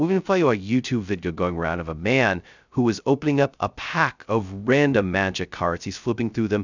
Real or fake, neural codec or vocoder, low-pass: fake; codec, 16 kHz, 0.2 kbps, FocalCodec; 7.2 kHz